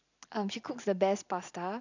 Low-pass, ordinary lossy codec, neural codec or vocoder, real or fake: 7.2 kHz; none; none; real